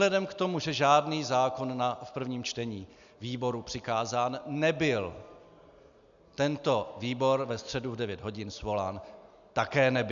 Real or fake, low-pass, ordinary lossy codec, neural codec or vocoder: real; 7.2 kHz; MP3, 96 kbps; none